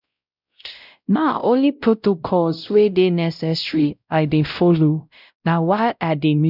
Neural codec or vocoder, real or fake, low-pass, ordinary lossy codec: codec, 16 kHz, 0.5 kbps, X-Codec, WavLM features, trained on Multilingual LibriSpeech; fake; 5.4 kHz; none